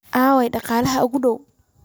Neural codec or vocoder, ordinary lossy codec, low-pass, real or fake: none; none; none; real